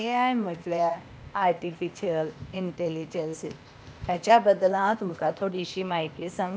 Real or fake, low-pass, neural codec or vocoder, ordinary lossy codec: fake; none; codec, 16 kHz, 0.8 kbps, ZipCodec; none